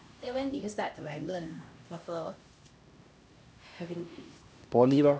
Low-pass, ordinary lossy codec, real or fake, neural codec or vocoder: none; none; fake; codec, 16 kHz, 1 kbps, X-Codec, HuBERT features, trained on LibriSpeech